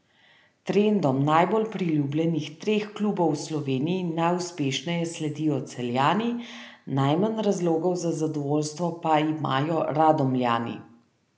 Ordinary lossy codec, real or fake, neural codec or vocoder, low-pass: none; real; none; none